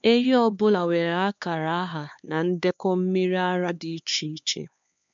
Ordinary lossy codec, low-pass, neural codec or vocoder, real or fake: MP3, 64 kbps; 7.2 kHz; codec, 16 kHz, 2 kbps, X-Codec, HuBERT features, trained on LibriSpeech; fake